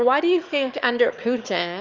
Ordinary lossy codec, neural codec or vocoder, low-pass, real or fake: Opus, 32 kbps; autoencoder, 22.05 kHz, a latent of 192 numbers a frame, VITS, trained on one speaker; 7.2 kHz; fake